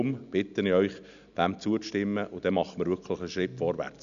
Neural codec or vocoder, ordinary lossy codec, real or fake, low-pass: none; none; real; 7.2 kHz